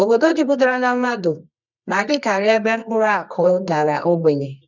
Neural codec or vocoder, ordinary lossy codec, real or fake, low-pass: codec, 24 kHz, 0.9 kbps, WavTokenizer, medium music audio release; none; fake; 7.2 kHz